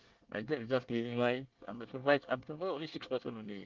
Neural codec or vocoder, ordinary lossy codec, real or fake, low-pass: codec, 24 kHz, 1 kbps, SNAC; Opus, 32 kbps; fake; 7.2 kHz